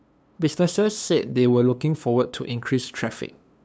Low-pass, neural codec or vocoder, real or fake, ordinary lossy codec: none; codec, 16 kHz, 2 kbps, FunCodec, trained on LibriTTS, 25 frames a second; fake; none